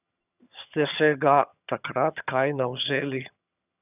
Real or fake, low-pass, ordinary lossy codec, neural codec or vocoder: fake; 3.6 kHz; none; vocoder, 22.05 kHz, 80 mel bands, HiFi-GAN